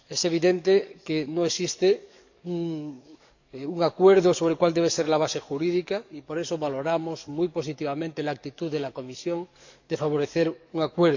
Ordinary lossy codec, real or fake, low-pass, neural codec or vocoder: none; fake; 7.2 kHz; codec, 44.1 kHz, 7.8 kbps, DAC